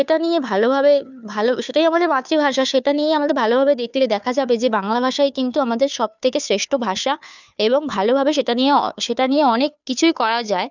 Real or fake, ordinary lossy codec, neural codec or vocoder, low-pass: fake; none; codec, 16 kHz, 2 kbps, FunCodec, trained on Chinese and English, 25 frames a second; 7.2 kHz